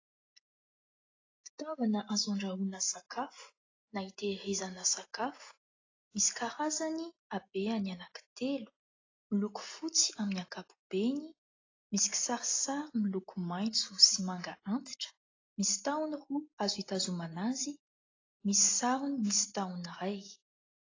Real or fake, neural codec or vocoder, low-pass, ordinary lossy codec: real; none; 7.2 kHz; AAC, 32 kbps